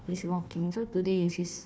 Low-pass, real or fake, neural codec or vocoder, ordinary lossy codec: none; fake; codec, 16 kHz, 1 kbps, FunCodec, trained on Chinese and English, 50 frames a second; none